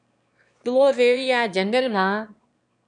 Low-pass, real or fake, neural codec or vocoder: 9.9 kHz; fake; autoencoder, 22.05 kHz, a latent of 192 numbers a frame, VITS, trained on one speaker